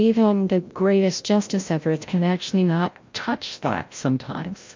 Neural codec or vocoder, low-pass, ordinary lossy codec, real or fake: codec, 16 kHz, 0.5 kbps, FreqCodec, larger model; 7.2 kHz; MP3, 48 kbps; fake